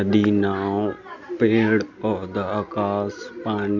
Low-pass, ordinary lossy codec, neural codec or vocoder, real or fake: 7.2 kHz; none; none; real